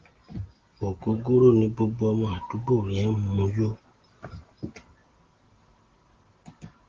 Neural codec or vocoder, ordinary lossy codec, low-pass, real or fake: none; Opus, 16 kbps; 7.2 kHz; real